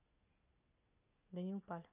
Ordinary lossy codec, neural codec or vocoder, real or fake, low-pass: none; none; real; 3.6 kHz